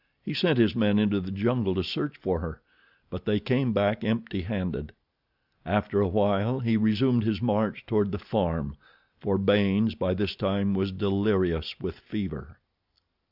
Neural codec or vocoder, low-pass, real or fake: none; 5.4 kHz; real